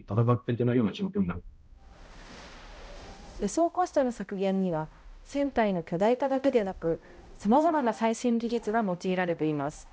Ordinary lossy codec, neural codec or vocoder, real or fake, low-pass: none; codec, 16 kHz, 0.5 kbps, X-Codec, HuBERT features, trained on balanced general audio; fake; none